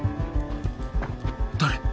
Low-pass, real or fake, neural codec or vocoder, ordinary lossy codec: none; real; none; none